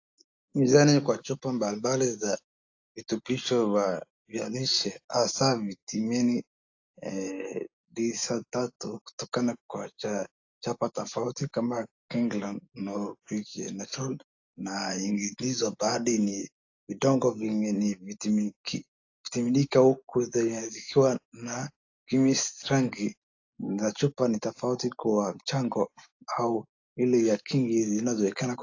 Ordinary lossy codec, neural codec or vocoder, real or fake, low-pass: AAC, 48 kbps; none; real; 7.2 kHz